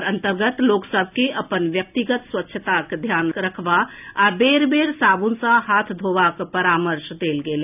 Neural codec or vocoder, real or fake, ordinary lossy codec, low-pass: none; real; none; 3.6 kHz